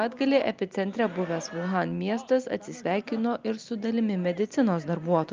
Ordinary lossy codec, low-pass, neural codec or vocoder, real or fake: Opus, 24 kbps; 7.2 kHz; none; real